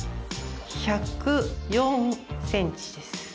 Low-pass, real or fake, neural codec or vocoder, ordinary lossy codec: none; real; none; none